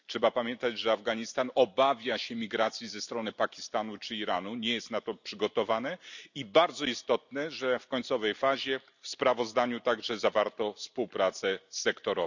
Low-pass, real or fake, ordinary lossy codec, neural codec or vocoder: 7.2 kHz; real; none; none